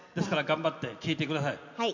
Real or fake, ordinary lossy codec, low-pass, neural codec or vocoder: real; none; 7.2 kHz; none